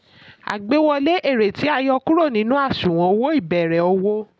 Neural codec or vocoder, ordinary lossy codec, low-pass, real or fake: none; none; none; real